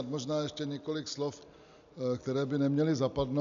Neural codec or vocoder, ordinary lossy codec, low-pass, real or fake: none; MP3, 96 kbps; 7.2 kHz; real